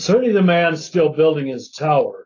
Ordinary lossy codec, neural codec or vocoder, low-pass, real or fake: AAC, 32 kbps; none; 7.2 kHz; real